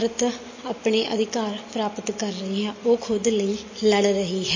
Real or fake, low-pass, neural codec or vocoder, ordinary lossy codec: real; 7.2 kHz; none; MP3, 32 kbps